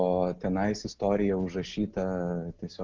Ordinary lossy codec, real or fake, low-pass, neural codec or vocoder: Opus, 16 kbps; real; 7.2 kHz; none